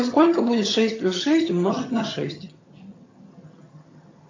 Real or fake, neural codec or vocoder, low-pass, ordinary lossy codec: fake; vocoder, 22.05 kHz, 80 mel bands, HiFi-GAN; 7.2 kHz; AAC, 32 kbps